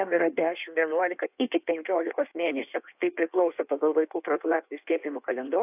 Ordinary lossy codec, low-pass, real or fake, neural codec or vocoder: AAC, 32 kbps; 3.6 kHz; fake; codec, 16 kHz in and 24 kHz out, 1.1 kbps, FireRedTTS-2 codec